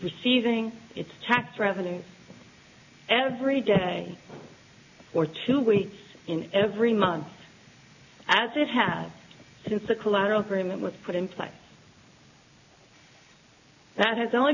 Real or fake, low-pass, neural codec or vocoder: real; 7.2 kHz; none